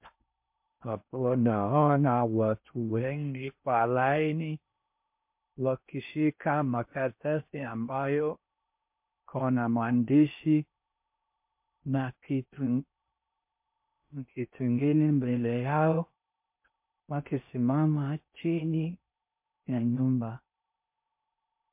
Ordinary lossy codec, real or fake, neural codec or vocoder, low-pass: MP3, 32 kbps; fake; codec, 16 kHz in and 24 kHz out, 0.6 kbps, FocalCodec, streaming, 4096 codes; 3.6 kHz